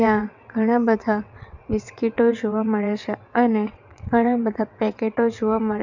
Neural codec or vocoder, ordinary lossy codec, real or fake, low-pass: vocoder, 22.05 kHz, 80 mel bands, WaveNeXt; none; fake; 7.2 kHz